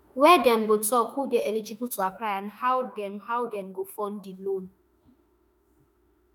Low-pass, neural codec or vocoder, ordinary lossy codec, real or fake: none; autoencoder, 48 kHz, 32 numbers a frame, DAC-VAE, trained on Japanese speech; none; fake